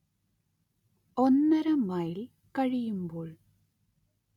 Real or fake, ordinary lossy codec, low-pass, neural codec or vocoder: real; none; 19.8 kHz; none